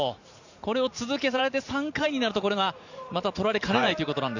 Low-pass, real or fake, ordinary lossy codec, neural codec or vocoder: 7.2 kHz; fake; none; vocoder, 44.1 kHz, 128 mel bands every 512 samples, BigVGAN v2